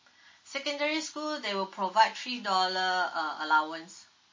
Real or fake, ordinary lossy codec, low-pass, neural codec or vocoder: real; MP3, 32 kbps; 7.2 kHz; none